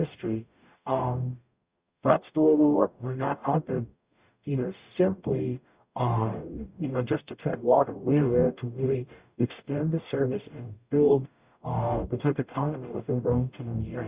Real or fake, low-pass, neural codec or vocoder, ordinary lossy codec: fake; 3.6 kHz; codec, 44.1 kHz, 0.9 kbps, DAC; Opus, 64 kbps